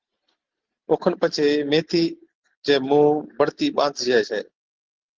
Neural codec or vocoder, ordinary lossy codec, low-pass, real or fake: none; Opus, 16 kbps; 7.2 kHz; real